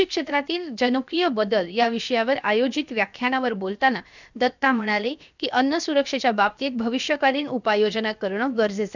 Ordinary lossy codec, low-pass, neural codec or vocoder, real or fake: none; 7.2 kHz; codec, 16 kHz, 0.7 kbps, FocalCodec; fake